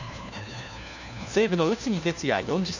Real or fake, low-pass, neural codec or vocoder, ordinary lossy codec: fake; 7.2 kHz; codec, 16 kHz, 1 kbps, FunCodec, trained on LibriTTS, 50 frames a second; none